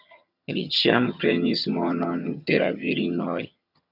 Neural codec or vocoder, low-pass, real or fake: vocoder, 22.05 kHz, 80 mel bands, HiFi-GAN; 5.4 kHz; fake